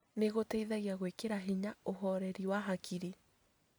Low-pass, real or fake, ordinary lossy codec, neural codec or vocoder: none; real; none; none